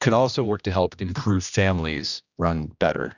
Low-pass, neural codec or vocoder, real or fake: 7.2 kHz; codec, 16 kHz, 1 kbps, X-Codec, HuBERT features, trained on general audio; fake